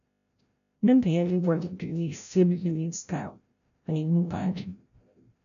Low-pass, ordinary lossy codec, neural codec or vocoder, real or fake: 7.2 kHz; none; codec, 16 kHz, 0.5 kbps, FreqCodec, larger model; fake